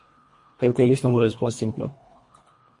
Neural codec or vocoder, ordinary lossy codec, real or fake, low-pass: codec, 24 kHz, 1.5 kbps, HILCodec; MP3, 48 kbps; fake; 10.8 kHz